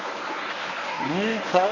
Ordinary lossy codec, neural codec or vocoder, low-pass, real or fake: none; codec, 24 kHz, 0.9 kbps, WavTokenizer, medium speech release version 1; 7.2 kHz; fake